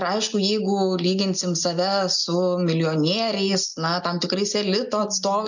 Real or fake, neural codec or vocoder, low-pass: real; none; 7.2 kHz